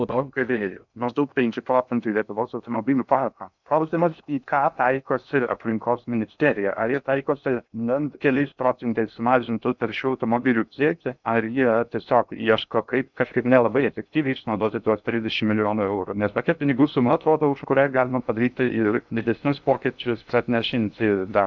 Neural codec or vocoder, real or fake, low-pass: codec, 16 kHz in and 24 kHz out, 0.6 kbps, FocalCodec, streaming, 2048 codes; fake; 7.2 kHz